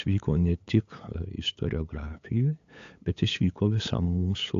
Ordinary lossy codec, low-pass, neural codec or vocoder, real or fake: AAC, 48 kbps; 7.2 kHz; codec, 16 kHz, 8 kbps, FunCodec, trained on LibriTTS, 25 frames a second; fake